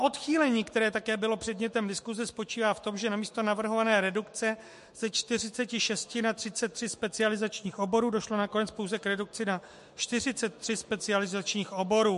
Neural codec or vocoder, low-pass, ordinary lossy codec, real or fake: autoencoder, 48 kHz, 128 numbers a frame, DAC-VAE, trained on Japanese speech; 14.4 kHz; MP3, 48 kbps; fake